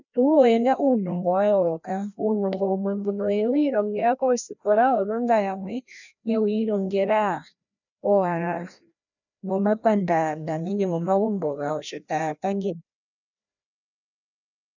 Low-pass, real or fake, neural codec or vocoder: 7.2 kHz; fake; codec, 16 kHz, 1 kbps, FreqCodec, larger model